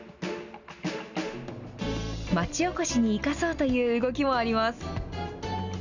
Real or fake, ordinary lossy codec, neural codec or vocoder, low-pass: real; none; none; 7.2 kHz